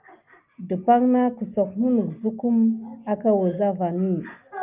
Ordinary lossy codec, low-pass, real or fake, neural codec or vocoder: Opus, 32 kbps; 3.6 kHz; real; none